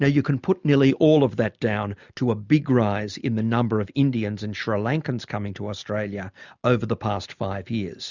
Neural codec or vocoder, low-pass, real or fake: none; 7.2 kHz; real